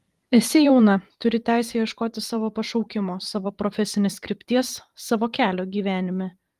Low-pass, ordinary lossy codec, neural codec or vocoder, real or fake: 14.4 kHz; Opus, 24 kbps; vocoder, 44.1 kHz, 128 mel bands every 512 samples, BigVGAN v2; fake